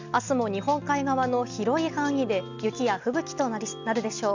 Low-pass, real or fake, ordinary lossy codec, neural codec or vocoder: 7.2 kHz; real; Opus, 64 kbps; none